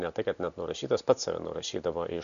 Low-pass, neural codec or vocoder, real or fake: 7.2 kHz; none; real